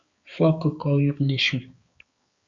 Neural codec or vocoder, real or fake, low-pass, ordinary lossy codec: codec, 16 kHz, 4 kbps, X-Codec, HuBERT features, trained on balanced general audio; fake; 7.2 kHz; Opus, 64 kbps